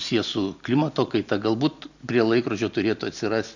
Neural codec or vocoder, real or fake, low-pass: none; real; 7.2 kHz